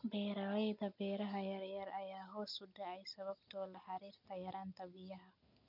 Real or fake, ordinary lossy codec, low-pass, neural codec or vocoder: real; none; 5.4 kHz; none